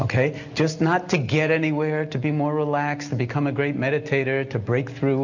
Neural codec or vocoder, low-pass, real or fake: none; 7.2 kHz; real